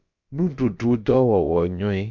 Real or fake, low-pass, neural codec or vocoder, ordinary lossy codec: fake; 7.2 kHz; codec, 16 kHz, about 1 kbps, DyCAST, with the encoder's durations; Opus, 64 kbps